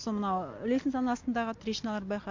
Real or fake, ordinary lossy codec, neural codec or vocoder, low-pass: real; MP3, 48 kbps; none; 7.2 kHz